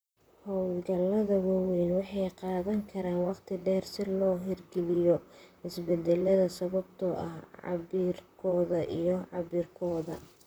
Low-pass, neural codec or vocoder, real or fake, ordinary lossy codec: none; vocoder, 44.1 kHz, 128 mel bands, Pupu-Vocoder; fake; none